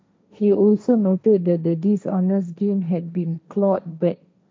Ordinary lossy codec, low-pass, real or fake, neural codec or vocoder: none; none; fake; codec, 16 kHz, 1.1 kbps, Voila-Tokenizer